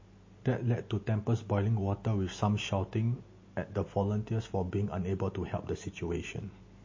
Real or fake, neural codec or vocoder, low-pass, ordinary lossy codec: real; none; 7.2 kHz; MP3, 32 kbps